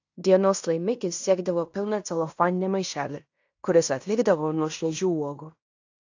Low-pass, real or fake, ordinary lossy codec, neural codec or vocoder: 7.2 kHz; fake; AAC, 48 kbps; codec, 16 kHz in and 24 kHz out, 0.9 kbps, LongCat-Audio-Codec, fine tuned four codebook decoder